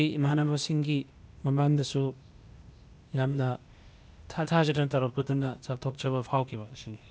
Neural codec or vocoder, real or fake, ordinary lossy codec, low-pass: codec, 16 kHz, 0.8 kbps, ZipCodec; fake; none; none